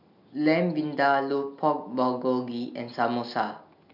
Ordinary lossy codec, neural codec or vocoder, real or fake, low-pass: none; none; real; 5.4 kHz